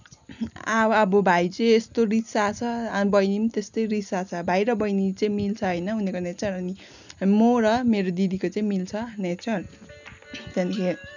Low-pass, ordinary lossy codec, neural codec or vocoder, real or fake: 7.2 kHz; none; none; real